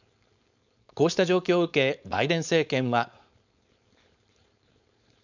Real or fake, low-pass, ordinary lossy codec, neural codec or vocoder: fake; 7.2 kHz; none; codec, 16 kHz, 4.8 kbps, FACodec